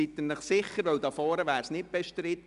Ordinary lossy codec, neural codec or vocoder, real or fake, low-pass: none; none; real; 10.8 kHz